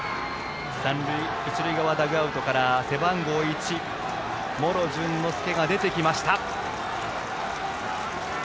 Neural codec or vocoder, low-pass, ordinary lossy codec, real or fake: none; none; none; real